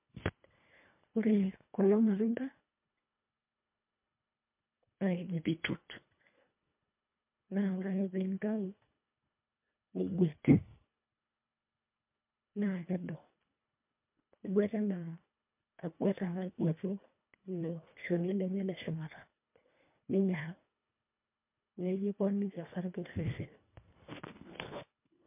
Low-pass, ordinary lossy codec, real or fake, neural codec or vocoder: 3.6 kHz; MP3, 32 kbps; fake; codec, 24 kHz, 1.5 kbps, HILCodec